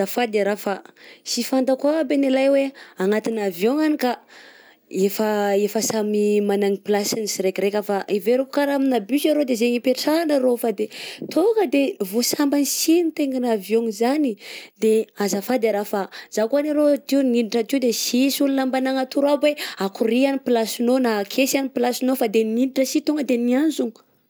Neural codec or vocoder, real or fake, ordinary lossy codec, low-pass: none; real; none; none